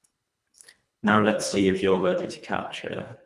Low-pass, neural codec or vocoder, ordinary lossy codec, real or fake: none; codec, 24 kHz, 1.5 kbps, HILCodec; none; fake